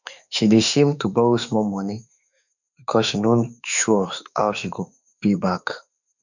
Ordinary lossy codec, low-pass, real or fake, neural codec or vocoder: none; 7.2 kHz; fake; autoencoder, 48 kHz, 32 numbers a frame, DAC-VAE, trained on Japanese speech